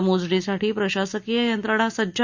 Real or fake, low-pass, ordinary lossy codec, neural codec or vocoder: real; 7.2 kHz; Opus, 64 kbps; none